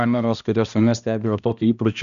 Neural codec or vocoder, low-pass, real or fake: codec, 16 kHz, 1 kbps, X-Codec, HuBERT features, trained on balanced general audio; 7.2 kHz; fake